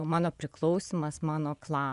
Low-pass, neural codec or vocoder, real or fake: 10.8 kHz; vocoder, 24 kHz, 100 mel bands, Vocos; fake